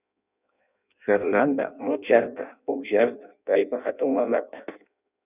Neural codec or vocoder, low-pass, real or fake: codec, 16 kHz in and 24 kHz out, 0.6 kbps, FireRedTTS-2 codec; 3.6 kHz; fake